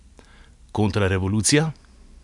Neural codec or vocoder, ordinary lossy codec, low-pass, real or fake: none; none; 10.8 kHz; real